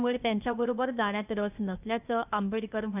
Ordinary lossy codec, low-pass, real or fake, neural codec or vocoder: none; 3.6 kHz; fake; codec, 16 kHz, 0.8 kbps, ZipCodec